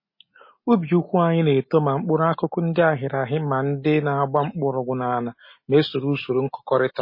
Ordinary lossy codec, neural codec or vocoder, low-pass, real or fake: MP3, 24 kbps; none; 5.4 kHz; real